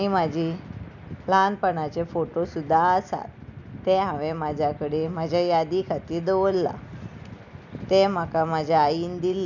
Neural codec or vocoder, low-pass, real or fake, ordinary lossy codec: none; 7.2 kHz; real; none